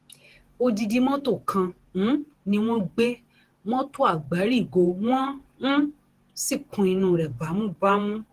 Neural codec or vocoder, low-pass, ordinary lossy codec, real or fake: vocoder, 48 kHz, 128 mel bands, Vocos; 14.4 kHz; Opus, 16 kbps; fake